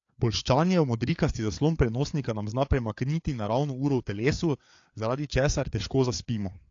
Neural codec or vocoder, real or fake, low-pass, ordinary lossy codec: codec, 16 kHz, 4 kbps, FreqCodec, larger model; fake; 7.2 kHz; AAC, 48 kbps